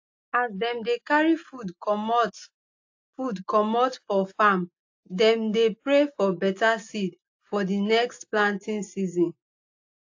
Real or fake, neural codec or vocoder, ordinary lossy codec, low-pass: real; none; AAC, 48 kbps; 7.2 kHz